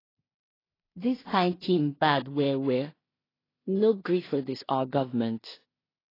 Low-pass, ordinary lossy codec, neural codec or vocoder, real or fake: 5.4 kHz; AAC, 24 kbps; codec, 16 kHz in and 24 kHz out, 0.4 kbps, LongCat-Audio-Codec, two codebook decoder; fake